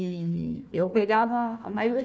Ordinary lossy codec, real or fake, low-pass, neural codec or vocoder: none; fake; none; codec, 16 kHz, 1 kbps, FunCodec, trained on Chinese and English, 50 frames a second